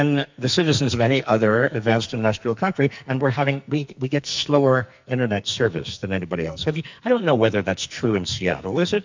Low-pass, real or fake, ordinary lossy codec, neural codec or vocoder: 7.2 kHz; fake; MP3, 64 kbps; codec, 44.1 kHz, 2.6 kbps, SNAC